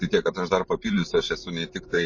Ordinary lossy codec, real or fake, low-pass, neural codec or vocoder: MP3, 32 kbps; fake; 7.2 kHz; vocoder, 44.1 kHz, 128 mel bands every 512 samples, BigVGAN v2